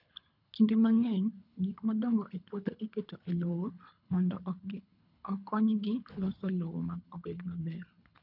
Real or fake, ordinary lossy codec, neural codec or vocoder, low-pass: fake; none; codec, 24 kHz, 3 kbps, HILCodec; 5.4 kHz